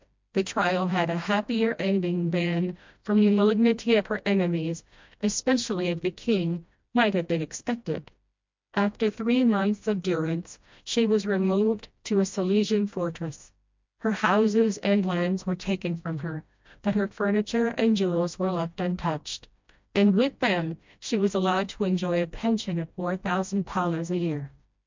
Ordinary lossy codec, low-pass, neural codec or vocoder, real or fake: MP3, 64 kbps; 7.2 kHz; codec, 16 kHz, 1 kbps, FreqCodec, smaller model; fake